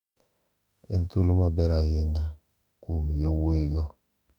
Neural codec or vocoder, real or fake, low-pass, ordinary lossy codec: autoencoder, 48 kHz, 32 numbers a frame, DAC-VAE, trained on Japanese speech; fake; 19.8 kHz; none